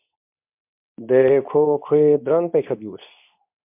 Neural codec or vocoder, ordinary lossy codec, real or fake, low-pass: codec, 24 kHz, 0.9 kbps, WavTokenizer, medium speech release version 2; MP3, 32 kbps; fake; 3.6 kHz